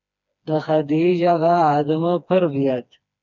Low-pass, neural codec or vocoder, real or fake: 7.2 kHz; codec, 16 kHz, 2 kbps, FreqCodec, smaller model; fake